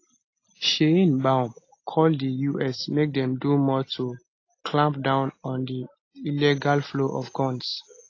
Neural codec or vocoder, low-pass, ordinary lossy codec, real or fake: none; 7.2 kHz; AAC, 32 kbps; real